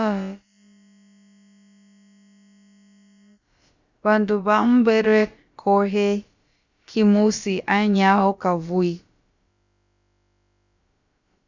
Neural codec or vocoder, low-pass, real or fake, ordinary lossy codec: codec, 16 kHz, about 1 kbps, DyCAST, with the encoder's durations; 7.2 kHz; fake; Opus, 64 kbps